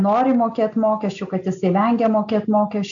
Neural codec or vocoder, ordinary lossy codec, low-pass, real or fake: none; AAC, 64 kbps; 7.2 kHz; real